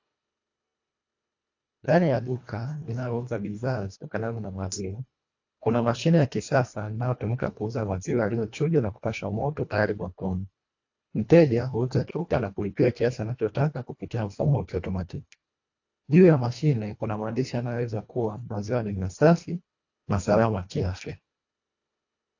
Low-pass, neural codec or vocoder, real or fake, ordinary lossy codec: 7.2 kHz; codec, 24 kHz, 1.5 kbps, HILCodec; fake; AAC, 48 kbps